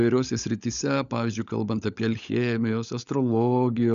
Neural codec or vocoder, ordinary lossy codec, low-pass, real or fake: codec, 16 kHz, 16 kbps, FunCodec, trained on LibriTTS, 50 frames a second; MP3, 96 kbps; 7.2 kHz; fake